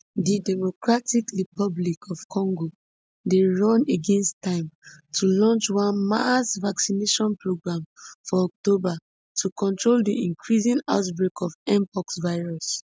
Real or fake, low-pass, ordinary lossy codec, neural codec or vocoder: real; none; none; none